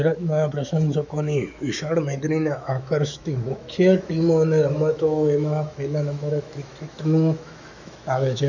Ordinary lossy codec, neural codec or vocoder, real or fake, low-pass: none; autoencoder, 48 kHz, 128 numbers a frame, DAC-VAE, trained on Japanese speech; fake; 7.2 kHz